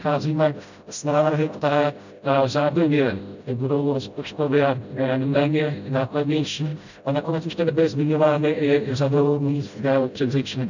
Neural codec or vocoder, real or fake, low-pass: codec, 16 kHz, 0.5 kbps, FreqCodec, smaller model; fake; 7.2 kHz